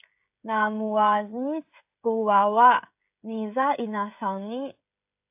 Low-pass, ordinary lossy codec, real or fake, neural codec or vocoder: 3.6 kHz; AAC, 32 kbps; fake; codec, 16 kHz, 16 kbps, FreqCodec, smaller model